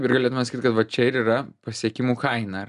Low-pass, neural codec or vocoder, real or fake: 10.8 kHz; none; real